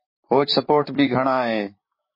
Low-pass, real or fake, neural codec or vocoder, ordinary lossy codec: 5.4 kHz; fake; vocoder, 44.1 kHz, 128 mel bands, Pupu-Vocoder; MP3, 24 kbps